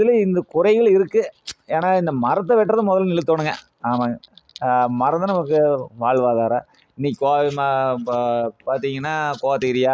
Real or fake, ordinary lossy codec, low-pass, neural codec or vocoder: real; none; none; none